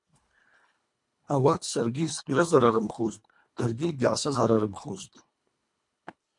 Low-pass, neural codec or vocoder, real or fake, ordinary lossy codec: 10.8 kHz; codec, 24 kHz, 1.5 kbps, HILCodec; fake; MP3, 64 kbps